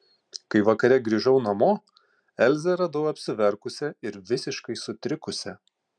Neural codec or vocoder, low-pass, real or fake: none; 9.9 kHz; real